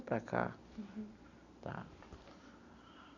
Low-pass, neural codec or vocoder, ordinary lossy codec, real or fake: 7.2 kHz; none; none; real